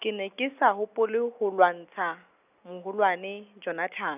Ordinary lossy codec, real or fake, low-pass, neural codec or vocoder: none; real; 3.6 kHz; none